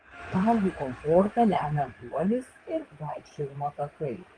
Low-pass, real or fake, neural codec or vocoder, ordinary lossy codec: 9.9 kHz; fake; codec, 16 kHz in and 24 kHz out, 2.2 kbps, FireRedTTS-2 codec; Opus, 24 kbps